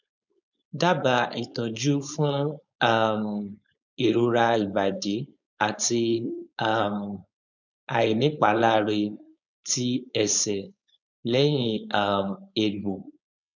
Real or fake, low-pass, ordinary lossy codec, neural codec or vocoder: fake; 7.2 kHz; none; codec, 16 kHz, 4.8 kbps, FACodec